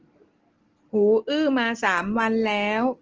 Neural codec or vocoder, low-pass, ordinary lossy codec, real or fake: none; 7.2 kHz; Opus, 16 kbps; real